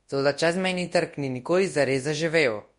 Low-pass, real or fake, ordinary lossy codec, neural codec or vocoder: 10.8 kHz; fake; MP3, 48 kbps; codec, 24 kHz, 0.9 kbps, WavTokenizer, large speech release